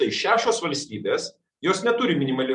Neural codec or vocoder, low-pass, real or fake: none; 10.8 kHz; real